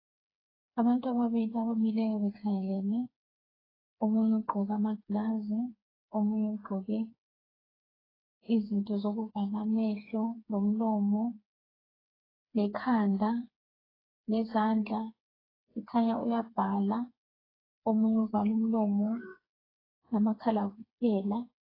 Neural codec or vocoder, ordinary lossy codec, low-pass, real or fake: codec, 16 kHz, 4 kbps, FreqCodec, smaller model; AAC, 24 kbps; 5.4 kHz; fake